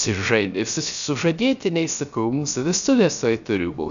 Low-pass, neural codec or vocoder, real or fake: 7.2 kHz; codec, 16 kHz, 0.3 kbps, FocalCodec; fake